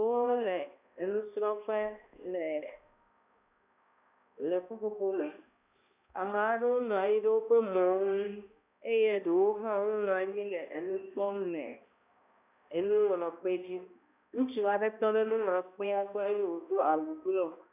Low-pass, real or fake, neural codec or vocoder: 3.6 kHz; fake; codec, 16 kHz, 1 kbps, X-Codec, HuBERT features, trained on balanced general audio